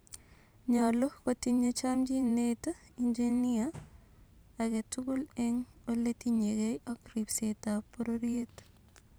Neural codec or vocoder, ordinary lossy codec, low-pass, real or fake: vocoder, 44.1 kHz, 128 mel bands every 512 samples, BigVGAN v2; none; none; fake